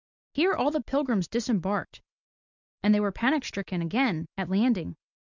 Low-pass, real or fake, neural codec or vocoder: 7.2 kHz; real; none